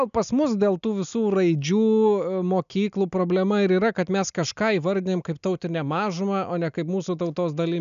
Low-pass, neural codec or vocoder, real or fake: 7.2 kHz; none; real